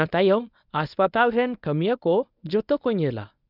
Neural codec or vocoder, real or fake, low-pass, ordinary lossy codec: codec, 24 kHz, 0.9 kbps, WavTokenizer, medium speech release version 1; fake; 5.4 kHz; none